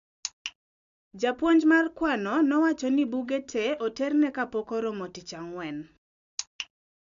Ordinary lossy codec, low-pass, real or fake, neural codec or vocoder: MP3, 64 kbps; 7.2 kHz; real; none